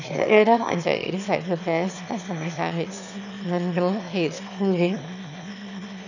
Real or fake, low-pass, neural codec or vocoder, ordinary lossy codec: fake; 7.2 kHz; autoencoder, 22.05 kHz, a latent of 192 numbers a frame, VITS, trained on one speaker; none